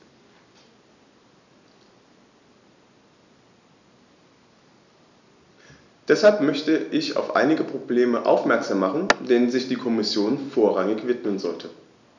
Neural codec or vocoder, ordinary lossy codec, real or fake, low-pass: none; none; real; 7.2 kHz